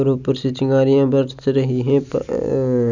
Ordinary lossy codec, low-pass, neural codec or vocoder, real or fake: none; 7.2 kHz; none; real